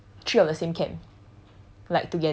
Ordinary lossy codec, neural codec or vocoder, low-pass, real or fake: none; none; none; real